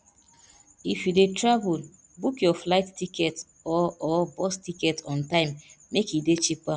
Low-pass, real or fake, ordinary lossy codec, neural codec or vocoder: none; real; none; none